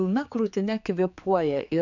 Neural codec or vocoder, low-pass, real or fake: codec, 16 kHz, 4 kbps, X-Codec, HuBERT features, trained on general audio; 7.2 kHz; fake